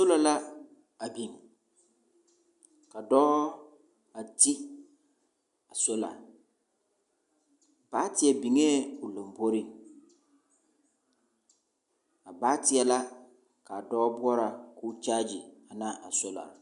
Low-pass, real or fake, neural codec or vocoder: 10.8 kHz; real; none